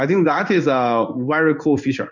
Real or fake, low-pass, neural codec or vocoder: fake; 7.2 kHz; codec, 16 kHz in and 24 kHz out, 1 kbps, XY-Tokenizer